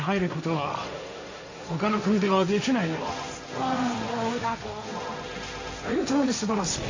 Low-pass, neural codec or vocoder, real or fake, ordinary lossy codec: 7.2 kHz; codec, 16 kHz, 1.1 kbps, Voila-Tokenizer; fake; AAC, 48 kbps